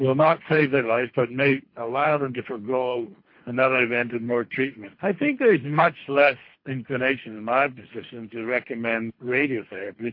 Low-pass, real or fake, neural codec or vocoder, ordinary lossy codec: 5.4 kHz; fake; codec, 24 kHz, 3 kbps, HILCodec; MP3, 32 kbps